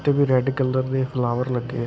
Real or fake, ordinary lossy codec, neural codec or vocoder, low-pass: real; none; none; none